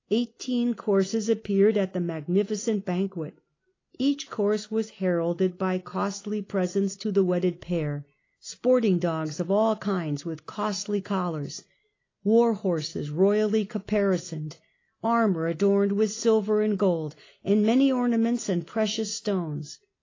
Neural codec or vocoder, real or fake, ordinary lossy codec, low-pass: none; real; AAC, 32 kbps; 7.2 kHz